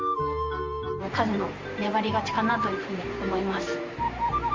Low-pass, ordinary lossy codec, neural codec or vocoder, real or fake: 7.2 kHz; Opus, 32 kbps; vocoder, 44.1 kHz, 128 mel bands every 512 samples, BigVGAN v2; fake